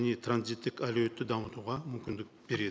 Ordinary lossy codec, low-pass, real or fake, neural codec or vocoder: none; none; real; none